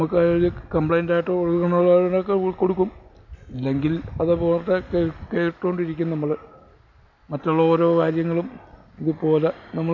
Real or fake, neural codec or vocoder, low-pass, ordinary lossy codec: real; none; 7.2 kHz; none